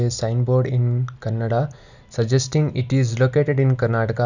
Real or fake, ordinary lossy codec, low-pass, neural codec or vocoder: real; none; 7.2 kHz; none